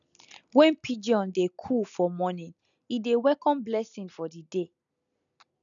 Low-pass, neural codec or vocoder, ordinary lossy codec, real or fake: 7.2 kHz; none; none; real